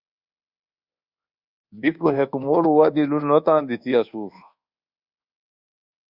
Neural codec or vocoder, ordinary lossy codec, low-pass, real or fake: codec, 24 kHz, 1.2 kbps, DualCodec; Opus, 64 kbps; 5.4 kHz; fake